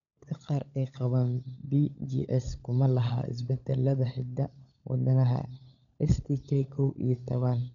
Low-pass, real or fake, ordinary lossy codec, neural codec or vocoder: 7.2 kHz; fake; none; codec, 16 kHz, 16 kbps, FunCodec, trained on LibriTTS, 50 frames a second